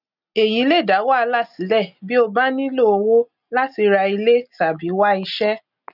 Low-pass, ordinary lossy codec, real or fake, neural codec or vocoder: 5.4 kHz; none; real; none